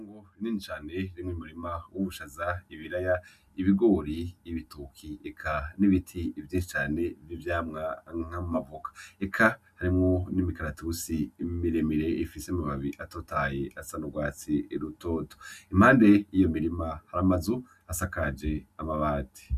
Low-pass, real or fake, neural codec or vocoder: 14.4 kHz; real; none